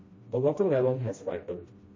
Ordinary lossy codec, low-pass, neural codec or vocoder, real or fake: MP3, 32 kbps; 7.2 kHz; codec, 16 kHz, 1 kbps, FreqCodec, smaller model; fake